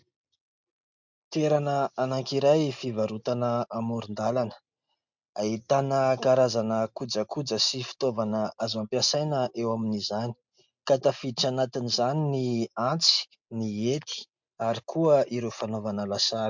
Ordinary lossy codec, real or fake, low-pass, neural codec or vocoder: MP3, 64 kbps; real; 7.2 kHz; none